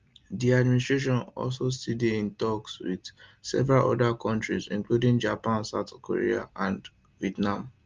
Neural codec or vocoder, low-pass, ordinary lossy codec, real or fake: none; 7.2 kHz; Opus, 32 kbps; real